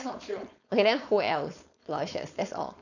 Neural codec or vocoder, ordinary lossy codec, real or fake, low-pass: codec, 16 kHz, 4.8 kbps, FACodec; none; fake; 7.2 kHz